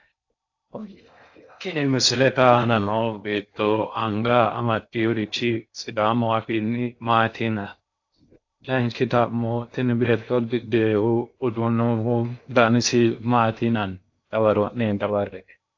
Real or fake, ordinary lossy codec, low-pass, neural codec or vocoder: fake; AAC, 48 kbps; 7.2 kHz; codec, 16 kHz in and 24 kHz out, 0.6 kbps, FocalCodec, streaming, 4096 codes